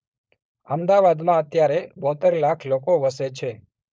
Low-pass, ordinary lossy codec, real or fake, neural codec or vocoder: none; none; fake; codec, 16 kHz, 4.8 kbps, FACodec